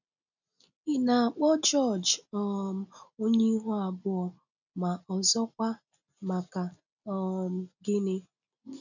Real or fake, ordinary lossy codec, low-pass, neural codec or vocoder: real; none; 7.2 kHz; none